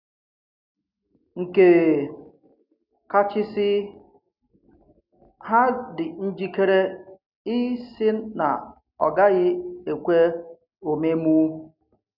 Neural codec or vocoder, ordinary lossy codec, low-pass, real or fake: none; none; 5.4 kHz; real